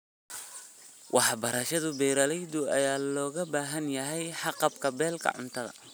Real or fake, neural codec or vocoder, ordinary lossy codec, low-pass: real; none; none; none